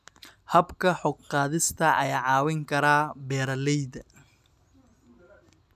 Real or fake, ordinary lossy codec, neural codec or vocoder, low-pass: real; none; none; 14.4 kHz